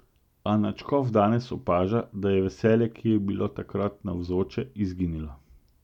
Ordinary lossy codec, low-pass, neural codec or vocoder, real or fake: none; 19.8 kHz; none; real